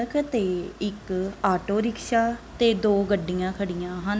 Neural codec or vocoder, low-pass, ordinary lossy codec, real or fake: none; none; none; real